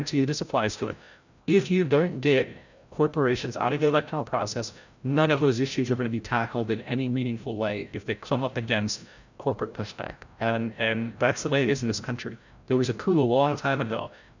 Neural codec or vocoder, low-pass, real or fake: codec, 16 kHz, 0.5 kbps, FreqCodec, larger model; 7.2 kHz; fake